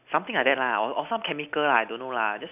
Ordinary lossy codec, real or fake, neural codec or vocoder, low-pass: none; real; none; 3.6 kHz